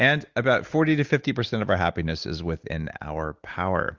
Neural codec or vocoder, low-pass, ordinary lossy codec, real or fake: none; 7.2 kHz; Opus, 32 kbps; real